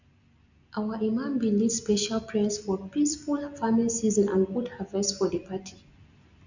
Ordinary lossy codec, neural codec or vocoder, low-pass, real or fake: none; none; 7.2 kHz; real